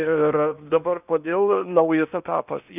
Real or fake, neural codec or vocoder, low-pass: fake; codec, 16 kHz in and 24 kHz out, 0.8 kbps, FocalCodec, streaming, 65536 codes; 3.6 kHz